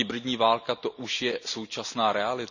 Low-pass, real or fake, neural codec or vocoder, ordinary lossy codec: 7.2 kHz; real; none; none